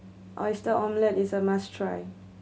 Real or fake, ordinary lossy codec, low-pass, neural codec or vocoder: real; none; none; none